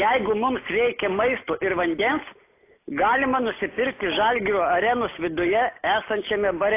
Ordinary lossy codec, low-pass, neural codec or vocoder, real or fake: AAC, 24 kbps; 3.6 kHz; none; real